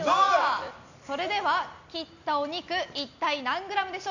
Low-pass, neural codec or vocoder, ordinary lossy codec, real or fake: 7.2 kHz; none; none; real